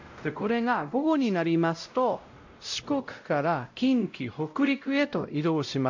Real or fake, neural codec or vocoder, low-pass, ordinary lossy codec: fake; codec, 16 kHz, 0.5 kbps, X-Codec, WavLM features, trained on Multilingual LibriSpeech; 7.2 kHz; AAC, 48 kbps